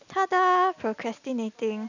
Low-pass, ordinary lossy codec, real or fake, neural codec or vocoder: 7.2 kHz; none; real; none